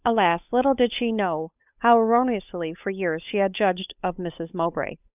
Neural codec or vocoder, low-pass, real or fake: codec, 16 kHz, 8 kbps, FunCodec, trained on Chinese and English, 25 frames a second; 3.6 kHz; fake